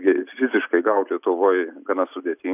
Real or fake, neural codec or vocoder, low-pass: real; none; 3.6 kHz